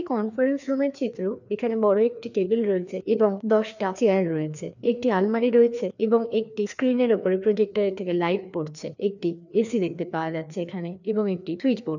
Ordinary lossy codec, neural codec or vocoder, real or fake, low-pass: none; codec, 16 kHz, 2 kbps, FreqCodec, larger model; fake; 7.2 kHz